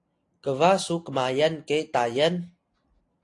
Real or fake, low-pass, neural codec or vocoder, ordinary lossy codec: real; 10.8 kHz; none; AAC, 48 kbps